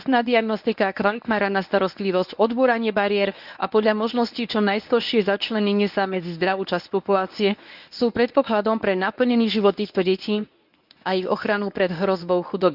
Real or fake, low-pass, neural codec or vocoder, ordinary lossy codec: fake; 5.4 kHz; codec, 24 kHz, 0.9 kbps, WavTokenizer, medium speech release version 1; none